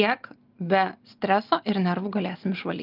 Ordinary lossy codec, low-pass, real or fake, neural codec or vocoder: Opus, 24 kbps; 5.4 kHz; real; none